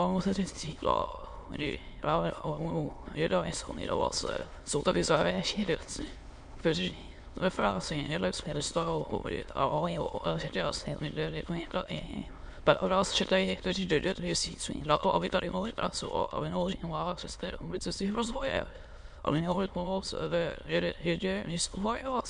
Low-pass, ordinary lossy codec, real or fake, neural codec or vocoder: 9.9 kHz; MP3, 64 kbps; fake; autoencoder, 22.05 kHz, a latent of 192 numbers a frame, VITS, trained on many speakers